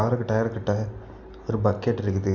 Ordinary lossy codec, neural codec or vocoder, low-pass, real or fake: none; none; 7.2 kHz; real